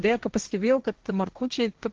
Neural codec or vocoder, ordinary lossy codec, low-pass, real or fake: codec, 16 kHz, 1.1 kbps, Voila-Tokenizer; Opus, 16 kbps; 7.2 kHz; fake